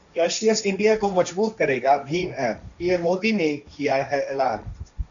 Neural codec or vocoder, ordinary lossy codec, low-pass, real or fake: codec, 16 kHz, 1.1 kbps, Voila-Tokenizer; MP3, 96 kbps; 7.2 kHz; fake